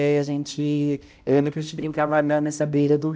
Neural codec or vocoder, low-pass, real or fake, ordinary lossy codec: codec, 16 kHz, 0.5 kbps, X-Codec, HuBERT features, trained on balanced general audio; none; fake; none